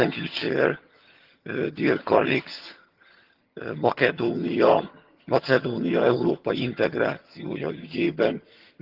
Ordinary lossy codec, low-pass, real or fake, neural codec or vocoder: Opus, 16 kbps; 5.4 kHz; fake; vocoder, 22.05 kHz, 80 mel bands, HiFi-GAN